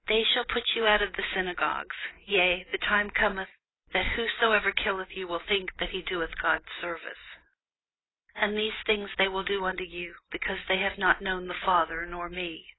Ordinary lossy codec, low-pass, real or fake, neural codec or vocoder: AAC, 16 kbps; 7.2 kHz; real; none